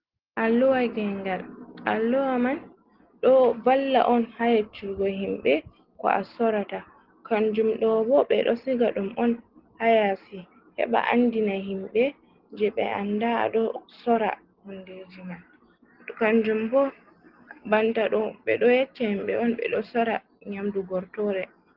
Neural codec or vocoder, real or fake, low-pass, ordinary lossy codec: none; real; 5.4 kHz; Opus, 16 kbps